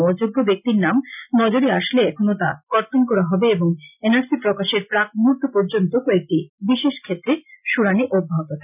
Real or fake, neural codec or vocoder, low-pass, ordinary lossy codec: real; none; 3.6 kHz; none